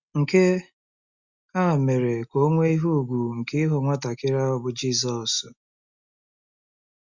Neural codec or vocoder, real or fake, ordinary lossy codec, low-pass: none; real; Opus, 64 kbps; 7.2 kHz